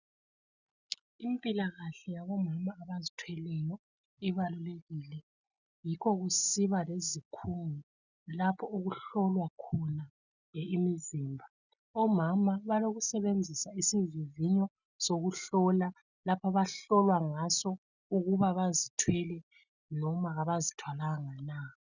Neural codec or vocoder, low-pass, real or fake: none; 7.2 kHz; real